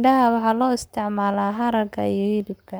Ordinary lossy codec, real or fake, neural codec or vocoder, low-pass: none; fake; codec, 44.1 kHz, 7.8 kbps, Pupu-Codec; none